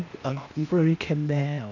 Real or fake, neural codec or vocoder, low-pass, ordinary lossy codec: fake; codec, 16 kHz, 0.8 kbps, ZipCodec; 7.2 kHz; none